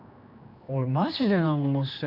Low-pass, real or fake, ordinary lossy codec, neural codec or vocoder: 5.4 kHz; fake; none; codec, 16 kHz, 2 kbps, X-Codec, HuBERT features, trained on general audio